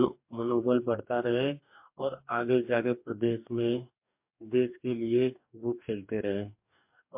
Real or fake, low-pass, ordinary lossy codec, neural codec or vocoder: fake; 3.6 kHz; MP3, 32 kbps; codec, 44.1 kHz, 2.6 kbps, DAC